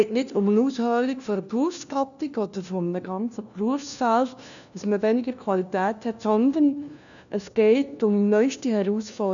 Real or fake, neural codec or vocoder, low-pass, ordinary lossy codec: fake; codec, 16 kHz, 1 kbps, FunCodec, trained on LibriTTS, 50 frames a second; 7.2 kHz; none